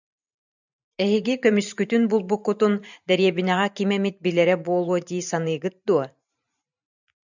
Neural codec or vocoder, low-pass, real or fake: vocoder, 44.1 kHz, 128 mel bands every 512 samples, BigVGAN v2; 7.2 kHz; fake